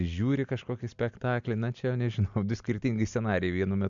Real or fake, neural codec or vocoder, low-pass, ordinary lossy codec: real; none; 7.2 kHz; MP3, 64 kbps